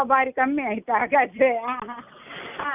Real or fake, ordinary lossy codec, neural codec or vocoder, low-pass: real; none; none; 3.6 kHz